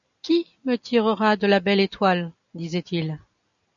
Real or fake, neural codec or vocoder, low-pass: real; none; 7.2 kHz